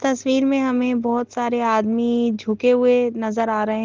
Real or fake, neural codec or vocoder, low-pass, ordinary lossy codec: real; none; 7.2 kHz; Opus, 16 kbps